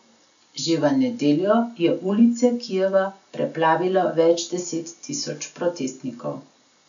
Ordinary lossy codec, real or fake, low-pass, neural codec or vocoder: none; real; 7.2 kHz; none